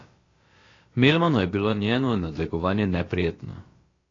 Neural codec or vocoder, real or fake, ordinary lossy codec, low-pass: codec, 16 kHz, about 1 kbps, DyCAST, with the encoder's durations; fake; AAC, 32 kbps; 7.2 kHz